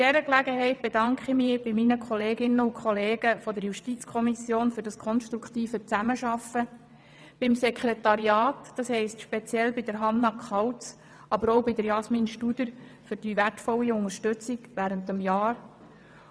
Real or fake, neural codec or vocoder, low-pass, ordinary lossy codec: fake; vocoder, 22.05 kHz, 80 mel bands, WaveNeXt; none; none